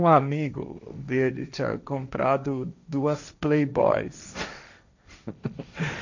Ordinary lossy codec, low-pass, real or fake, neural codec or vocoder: none; 7.2 kHz; fake; codec, 16 kHz, 1.1 kbps, Voila-Tokenizer